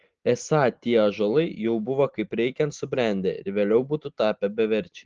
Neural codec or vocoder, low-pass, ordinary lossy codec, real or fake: none; 7.2 kHz; Opus, 16 kbps; real